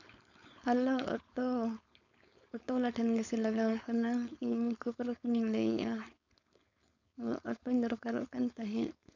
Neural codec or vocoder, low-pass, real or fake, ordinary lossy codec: codec, 16 kHz, 4.8 kbps, FACodec; 7.2 kHz; fake; none